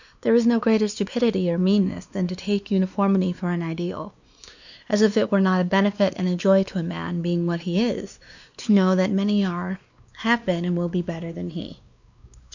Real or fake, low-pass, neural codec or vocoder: fake; 7.2 kHz; codec, 16 kHz, 2 kbps, X-Codec, WavLM features, trained on Multilingual LibriSpeech